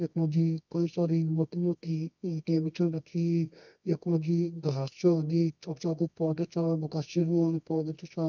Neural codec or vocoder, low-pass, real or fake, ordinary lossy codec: codec, 24 kHz, 0.9 kbps, WavTokenizer, medium music audio release; 7.2 kHz; fake; none